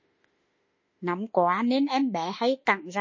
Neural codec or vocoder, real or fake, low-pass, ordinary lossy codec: autoencoder, 48 kHz, 32 numbers a frame, DAC-VAE, trained on Japanese speech; fake; 7.2 kHz; MP3, 32 kbps